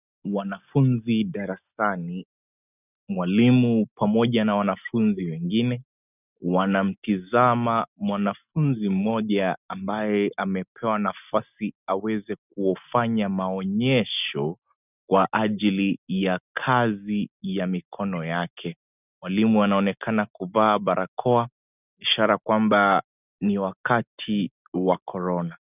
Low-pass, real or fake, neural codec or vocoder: 3.6 kHz; real; none